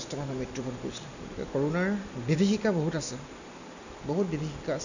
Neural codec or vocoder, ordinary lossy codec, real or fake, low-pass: none; none; real; 7.2 kHz